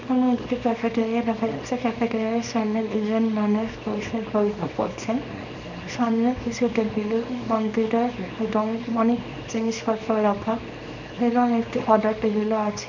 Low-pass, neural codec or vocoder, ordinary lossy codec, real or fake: 7.2 kHz; codec, 24 kHz, 0.9 kbps, WavTokenizer, small release; none; fake